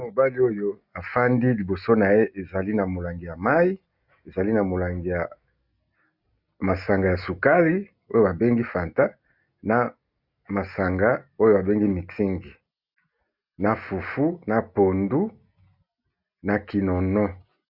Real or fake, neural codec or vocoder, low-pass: real; none; 5.4 kHz